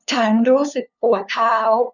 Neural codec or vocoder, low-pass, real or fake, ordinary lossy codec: codec, 16 kHz, 2 kbps, FunCodec, trained on LibriTTS, 25 frames a second; 7.2 kHz; fake; none